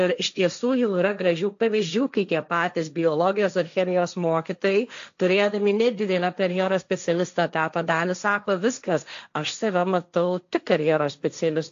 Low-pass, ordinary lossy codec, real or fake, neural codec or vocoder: 7.2 kHz; AAC, 64 kbps; fake; codec, 16 kHz, 1.1 kbps, Voila-Tokenizer